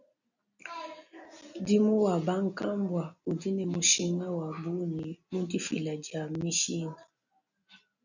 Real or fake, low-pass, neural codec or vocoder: real; 7.2 kHz; none